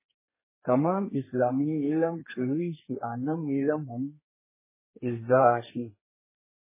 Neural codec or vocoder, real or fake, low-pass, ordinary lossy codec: codec, 32 kHz, 1.9 kbps, SNAC; fake; 3.6 kHz; MP3, 16 kbps